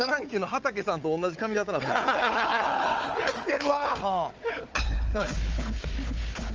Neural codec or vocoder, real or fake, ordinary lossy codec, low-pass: codec, 16 kHz, 4 kbps, FunCodec, trained on Chinese and English, 50 frames a second; fake; Opus, 24 kbps; 7.2 kHz